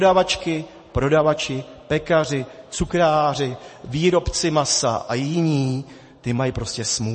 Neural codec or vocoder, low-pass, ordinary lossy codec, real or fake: vocoder, 44.1 kHz, 128 mel bands every 512 samples, BigVGAN v2; 10.8 kHz; MP3, 32 kbps; fake